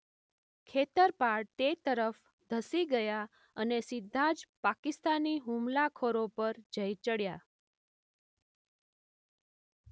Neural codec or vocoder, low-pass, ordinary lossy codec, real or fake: none; none; none; real